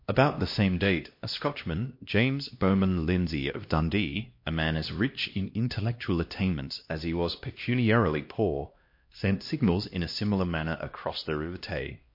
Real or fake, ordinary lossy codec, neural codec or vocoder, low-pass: fake; MP3, 32 kbps; codec, 16 kHz, 1 kbps, X-Codec, HuBERT features, trained on LibriSpeech; 5.4 kHz